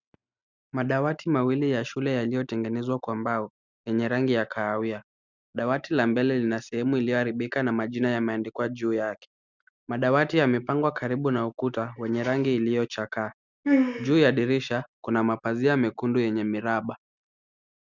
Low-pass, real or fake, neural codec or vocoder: 7.2 kHz; real; none